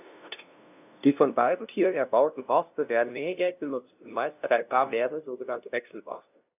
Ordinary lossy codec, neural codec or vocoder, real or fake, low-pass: none; codec, 16 kHz, 0.5 kbps, FunCodec, trained on LibriTTS, 25 frames a second; fake; 3.6 kHz